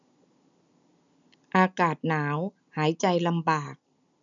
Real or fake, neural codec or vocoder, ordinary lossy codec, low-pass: real; none; none; 7.2 kHz